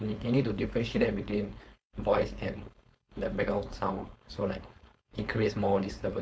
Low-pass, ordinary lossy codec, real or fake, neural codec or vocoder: none; none; fake; codec, 16 kHz, 4.8 kbps, FACodec